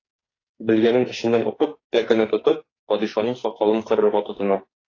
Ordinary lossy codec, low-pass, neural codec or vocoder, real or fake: AAC, 32 kbps; 7.2 kHz; codec, 44.1 kHz, 2.6 kbps, SNAC; fake